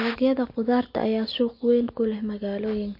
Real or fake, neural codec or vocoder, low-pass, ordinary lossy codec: real; none; 5.4 kHz; MP3, 32 kbps